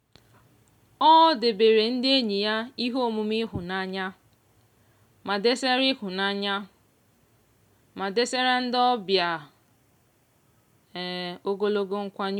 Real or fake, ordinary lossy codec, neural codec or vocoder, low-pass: real; MP3, 96 kbps; none; 19.8 kHz